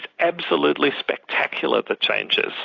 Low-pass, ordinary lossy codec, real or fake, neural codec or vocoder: 7.2 kHz; AAC, 32 kbps; real; none